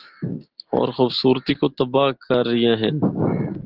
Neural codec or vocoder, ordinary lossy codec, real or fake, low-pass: none; Opus, 16 kbps; real; 5.4 kHz